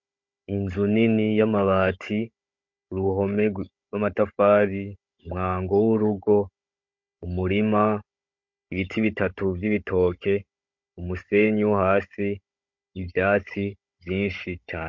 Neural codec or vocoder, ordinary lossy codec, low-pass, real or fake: codec, 16 kHz, 16 kbps, FunCodec, trained on Chinese and English, 50 frames a second; MP3, 48 kbps; 7.2 kHz; fake